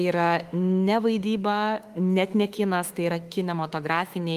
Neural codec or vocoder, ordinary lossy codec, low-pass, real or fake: autoencoder, 48 kHz, 32 numbers a frame, DAC-VAE, trained on Japanese speech; Opus, 24 kbps; 14.4 kHz; fake